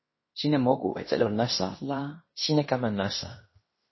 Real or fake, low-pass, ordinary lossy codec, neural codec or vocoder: fake; 7.2 kHz; MP3, 24 kbps; codec, 16 kHz in and 24 kHz out, 0.9 kbps, LongCat-Audio-Codec, fine tuned four codebook decoder